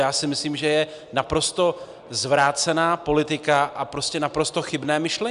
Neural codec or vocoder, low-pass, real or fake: none; 10.8 kHz; real